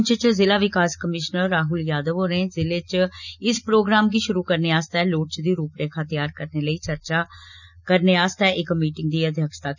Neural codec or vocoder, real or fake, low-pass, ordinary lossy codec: none; real; 7.2 kHz; none